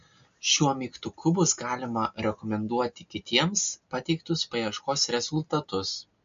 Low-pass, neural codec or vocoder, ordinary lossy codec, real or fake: 7.2 kHz; none; MP3, 48 kbps; real